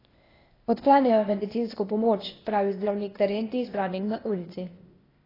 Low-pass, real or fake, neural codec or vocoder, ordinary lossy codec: 5.4 kHz; fake; codec, 16 kHz, 0.8 kbps, ZipCodec; AAC, 24 kbps